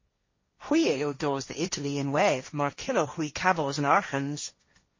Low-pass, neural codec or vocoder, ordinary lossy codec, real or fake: 7.2 kHz; codec, 16 kHz, 1.1 kbps, Voila-Tokenizer; MP3, 32 kbps; fake